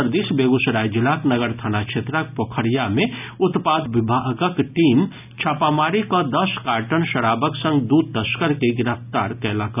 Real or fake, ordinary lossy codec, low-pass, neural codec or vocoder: real; none; 3.6 kHz; none